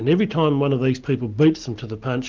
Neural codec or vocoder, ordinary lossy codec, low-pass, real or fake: none; Opus, 32 kbps; 7.2 kHz; real